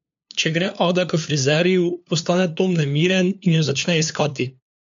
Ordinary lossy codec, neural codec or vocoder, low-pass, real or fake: MP3, 64 kbps; codec, 16 kHz, 2 kbps, FunCodec, trained on LibriTTS, 25 frames a second; 7.2 kHz; fake